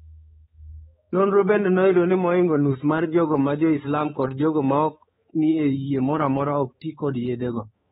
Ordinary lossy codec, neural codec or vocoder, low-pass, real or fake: AAC, 16 kbps; codec, 16 kHz, 4 kbps, X-Codec, HuBERT features, trained on balanced general audio; 7.2 kHz; fake